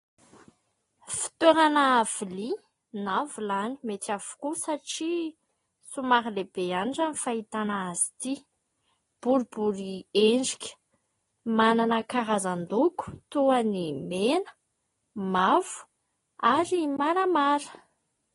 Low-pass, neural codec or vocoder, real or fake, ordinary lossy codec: 10.8 kHz; none; real; AAC, 32 kbps